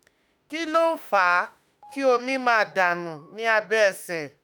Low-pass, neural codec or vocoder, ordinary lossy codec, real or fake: none; autoencoder, 48 kHz, 32 numbers a frame, DAC-VAE, trained on Japanese speech; none; fake